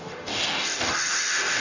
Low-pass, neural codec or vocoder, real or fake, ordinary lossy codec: 7.2 kHz; codec, 44.1 kHz, 0.9 kbps, DAC; fake; none